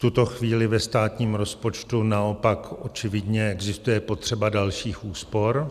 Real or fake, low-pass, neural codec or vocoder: real; 14.4 kHz; none